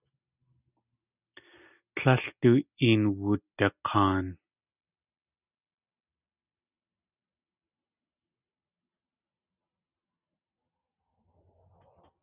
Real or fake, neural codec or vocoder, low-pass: real; none; 3.6 kHz